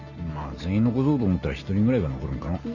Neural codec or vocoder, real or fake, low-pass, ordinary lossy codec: none; real; 7.2 kHz; none